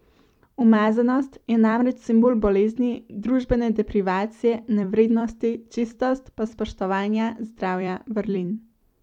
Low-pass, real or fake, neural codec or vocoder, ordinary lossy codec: 19.8 kHz; fake; vocoder, 44.1 kHz, 128 mel bands every 256 samples, BigVGAN v2; none